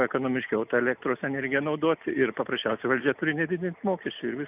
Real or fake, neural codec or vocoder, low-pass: real; none; 3.6 kHz